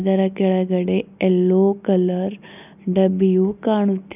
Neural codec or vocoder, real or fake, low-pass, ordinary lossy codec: none; real; 3.6 kHz; none